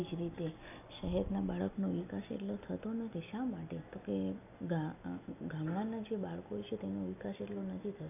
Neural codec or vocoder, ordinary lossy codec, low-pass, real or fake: none; none; 3.6 kHz; real